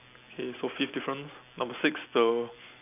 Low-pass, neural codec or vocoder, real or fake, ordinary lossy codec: 3.6 kHz; none; real; none